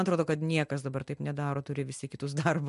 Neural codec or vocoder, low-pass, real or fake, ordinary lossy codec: none; 10.8 kHz; real; MP3, 64 kbps